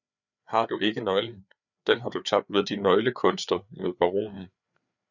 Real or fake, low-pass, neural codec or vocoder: fake; 7.2 kHz; codec, 16 kHz, 4 kbps, FreqCodec, larger model